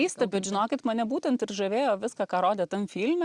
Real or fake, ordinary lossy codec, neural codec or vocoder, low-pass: real; Opus, 64 kbps; none; 10.8 kHz